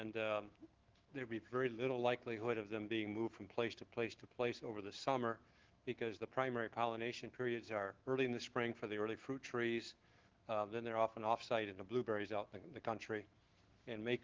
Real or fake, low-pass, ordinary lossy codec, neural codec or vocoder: fake; 7.2 kHz; Opus, 16 kbps; autoencoder, 48 kHz, 128 numbers a frame, DAC-VAE, trained on Japanese speech